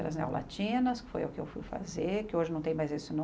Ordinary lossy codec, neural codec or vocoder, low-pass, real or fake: none; none; none; real